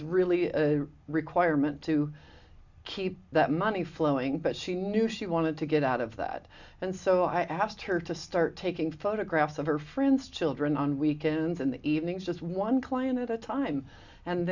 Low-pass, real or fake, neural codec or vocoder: 7.2 kHz; real; none